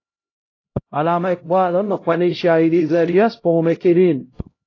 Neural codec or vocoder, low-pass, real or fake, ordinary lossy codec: codec, 16 kHz, 0.5 kbps, X-Codec, HuBERT features, trained on LibriSpeech; 7.2 kHz; fake; AAC, 32 kbps